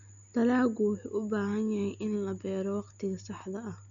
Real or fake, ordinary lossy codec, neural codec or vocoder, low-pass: real; Opus, 64 kbps; none; 7.2 kHz